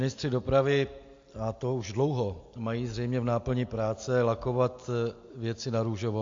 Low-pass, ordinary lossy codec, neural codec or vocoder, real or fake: 7.2 kHz; AAC, 48 kbps; none; real